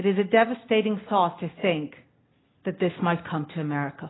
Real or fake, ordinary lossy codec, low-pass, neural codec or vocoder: real; AAC, 16 kbps; 7.2 kHz; none